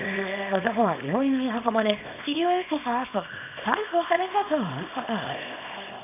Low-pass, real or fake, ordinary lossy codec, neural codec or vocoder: 3.6 kHz; fake; none; codec, 24 kHz, 0.9 kbps, WavTokenizer, small release